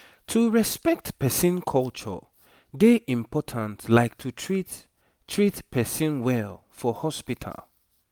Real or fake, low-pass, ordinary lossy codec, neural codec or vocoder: real; none; none; none